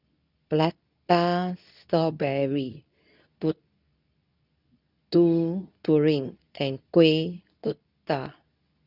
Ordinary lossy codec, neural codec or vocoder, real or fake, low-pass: none; codec, 24 kHz, 0.9 kbps, WavTokenizer, medium speech release version 2; fake; 5.4 kHz